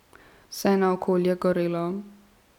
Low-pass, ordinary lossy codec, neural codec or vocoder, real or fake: 19.8 kHz; none; none; real